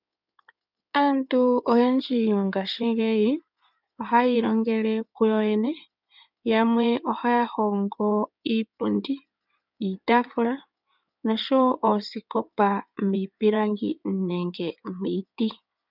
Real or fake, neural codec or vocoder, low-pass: fake; codec, 16 kHz in and 24 kHz out, 2.2 kbps, FireRedTTS-2 codec; 5.4 kHz